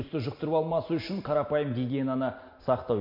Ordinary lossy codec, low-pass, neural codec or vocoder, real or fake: MP3, 32 kbps; 5.4 kHz; none; real